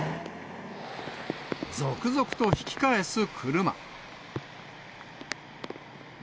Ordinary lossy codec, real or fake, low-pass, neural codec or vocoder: none; real; none; none